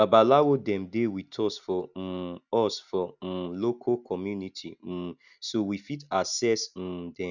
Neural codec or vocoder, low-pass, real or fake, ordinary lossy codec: none; 7.2 kHz; real; none